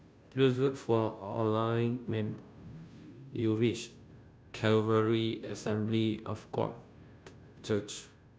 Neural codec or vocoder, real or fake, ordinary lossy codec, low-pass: codec, 16 kHz, 0.5 kbps, FunCodec, trained on Chinese and English, 25 frames a second; fake; none; none